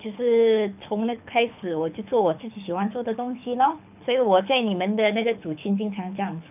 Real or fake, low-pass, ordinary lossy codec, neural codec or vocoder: fake; 3.6 kHz; none; codec, 24 kHz, 6 kbps, HILCodec